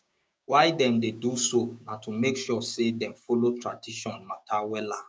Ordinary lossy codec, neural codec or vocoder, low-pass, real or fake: none; codec, 16 kHz, 6 kbps, DAC; none; fake